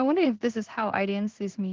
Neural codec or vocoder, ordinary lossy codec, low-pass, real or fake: codec, 16 kHz in and 24 kHz out, 0.4 kbps, LongCat-Audio-Codec, two codebook decoder; Opus, 16 kbps; 7.2 kHz; fake